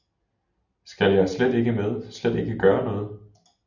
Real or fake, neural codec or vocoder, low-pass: real; none; 7.2 kHz